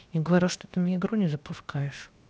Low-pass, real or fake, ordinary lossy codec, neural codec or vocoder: none; fake; none; codec, 16 kHz, 0.7 kbps, FocalCodec